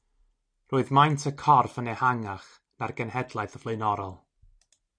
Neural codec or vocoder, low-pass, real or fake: none; 9.9 kHz; real